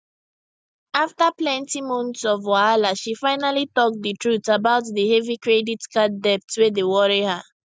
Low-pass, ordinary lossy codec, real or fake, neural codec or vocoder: none; none; real; none